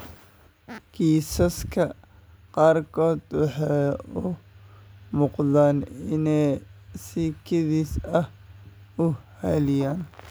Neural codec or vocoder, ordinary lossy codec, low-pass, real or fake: none; none; none; real